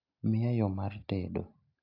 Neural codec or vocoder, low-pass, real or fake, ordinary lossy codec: none; 5.4 kHz; real; AAC, 48 kbps